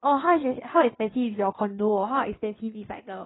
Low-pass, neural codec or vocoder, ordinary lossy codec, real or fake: 7.2 kHz; codec, 16 kHz in and 24 kHz out, 1.1 kbps, FireRedTTS-2 codec; AAC, 16 kbps; fake